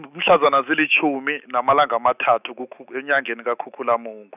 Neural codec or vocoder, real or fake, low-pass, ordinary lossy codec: autoencoder, 48 kHz, 128 numbers a frame, DAC-VAE, trained on Japanese speech; fake; 3.6 kHz; none